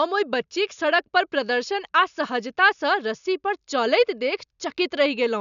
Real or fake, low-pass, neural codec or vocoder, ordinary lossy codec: real; 7.2 kHz; none; none